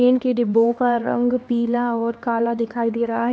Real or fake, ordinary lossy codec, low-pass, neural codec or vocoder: fake; none; none; codec, 16 kHz, 2 kbps, X-Codec, HuBERT features, trained on LibriSpeech